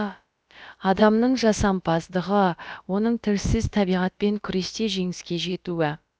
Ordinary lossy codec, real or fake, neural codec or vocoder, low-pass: none; fake; codec, 16 kHz, about 1 kbps, DyCAST, with the encoder's durations; none